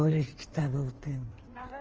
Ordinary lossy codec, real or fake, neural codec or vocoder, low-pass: Opus, 24 kbps; fake; codec, 16 kHz in and 24 kHz out, 1.1 kbps, FireRedTTS-2 codec; 7.2 kHz